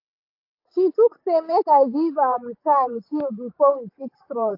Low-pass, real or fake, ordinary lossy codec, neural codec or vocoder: 5.4 kHz; fake; AAC, 32 kbps; vocoder, 44.1 kHz, 128 mel bands, Pupu-Vocoder